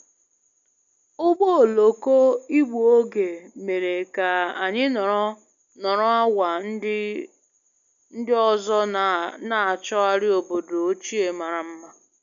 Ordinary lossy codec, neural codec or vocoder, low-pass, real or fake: none; none; 7.2 kHz; real